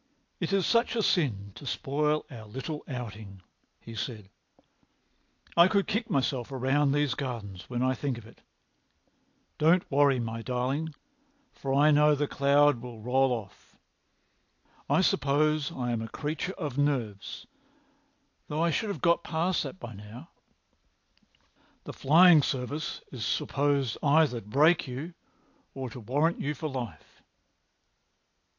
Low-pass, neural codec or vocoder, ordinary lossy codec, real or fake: 7.2 kHz; none; AAC, 48 kbps; real